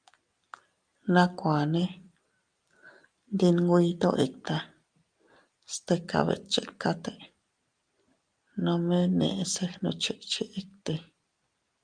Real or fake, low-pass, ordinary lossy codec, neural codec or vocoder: real; 9.9 kHz; Opus, 32 kbps; none